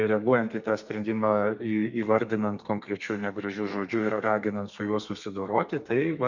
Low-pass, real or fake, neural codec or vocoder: 7.2 kHz; fake; codec, 32 kHz, 1.9 kbps, SNAC